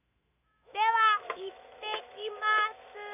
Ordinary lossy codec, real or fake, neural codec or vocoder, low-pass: none; fake; autoencoder, 48 kHz, 128 numbers a frame, DAC-VAE, trained on Japanese speech; 3.6 kHz